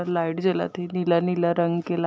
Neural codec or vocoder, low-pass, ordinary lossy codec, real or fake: none; none; none; real